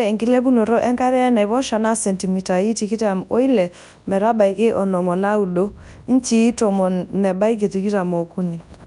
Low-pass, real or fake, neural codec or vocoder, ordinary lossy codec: 10.8 kHz; fake; codec, 24 kHz, 0.9 kbps, WavTokenizer, large speech release; none